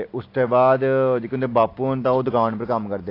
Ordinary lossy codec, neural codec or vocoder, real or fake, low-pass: AAC, 32 kbps; none; real; 5.4 kHz